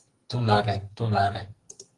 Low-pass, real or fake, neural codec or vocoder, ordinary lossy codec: 10.8 kHz; fake; codec, 44.1 kHz, 2.6 kbps, SNAC; Opus, 24 kbps